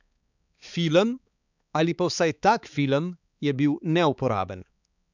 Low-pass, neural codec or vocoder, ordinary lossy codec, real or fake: 7.2 kHz; codec, 16 kHz, 4 kbps, X-Codec, HuBERT features, trained on balanced general audio; none; fake